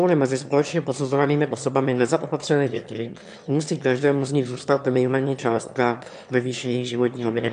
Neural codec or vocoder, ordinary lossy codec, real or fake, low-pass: autoencoder, 22.05 kHz, a latent of 192 numbers a frame, VITS, trained on one speaker; AAC, 96 kbps; fake; 9.9 kHz